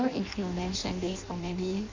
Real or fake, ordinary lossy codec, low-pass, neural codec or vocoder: fake; MP3, 64 kbps; 7.2 kHz; codec, 16 kHz in and 24 kHz out, 0.6 kbps, FireRedTTS-2 codec